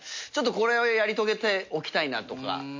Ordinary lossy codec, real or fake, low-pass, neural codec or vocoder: MP3, 48 kbps; real; 7.2 kHz; none